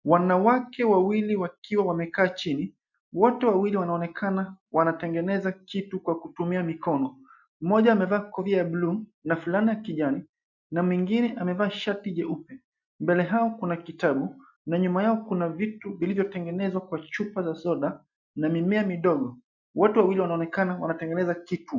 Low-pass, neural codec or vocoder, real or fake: 7.2 kHz; none; real